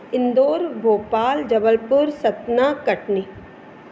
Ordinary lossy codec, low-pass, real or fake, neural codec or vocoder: none; none; real; none